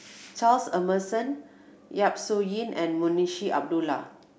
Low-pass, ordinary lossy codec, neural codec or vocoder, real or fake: none; none; none; real